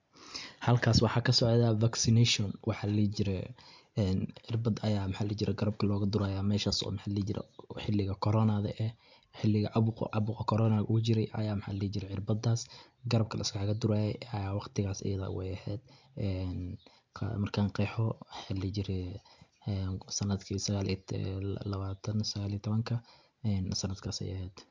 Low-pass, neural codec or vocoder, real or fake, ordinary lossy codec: 7.2 kHz; none; real; MP3, 64 kbps